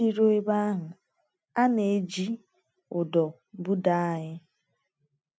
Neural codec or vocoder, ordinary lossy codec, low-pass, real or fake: none; none; none; real